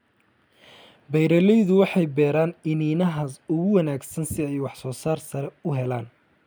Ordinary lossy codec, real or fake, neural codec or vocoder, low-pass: none; real; none; none